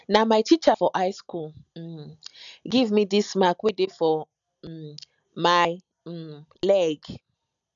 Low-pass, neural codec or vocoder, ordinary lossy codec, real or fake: 7.2 kHz; none; none; real